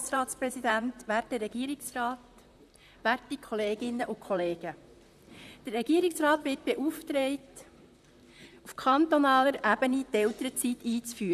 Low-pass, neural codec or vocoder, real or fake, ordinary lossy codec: 14.4 kHz; vocoder, 44.1 kHz, 128 mel bands, Pupu-Vocoder; fake; none